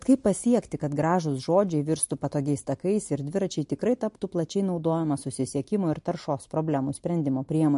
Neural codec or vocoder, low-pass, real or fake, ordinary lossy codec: none; 10.8 kHz; real; MP3, 48 kbps